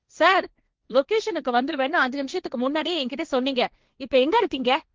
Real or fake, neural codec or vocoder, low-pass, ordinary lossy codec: fake; codec, 16 kHz, 1.1 kbps, Voila-Tokenizer; 7.2 kHz; Opus, 32 kbps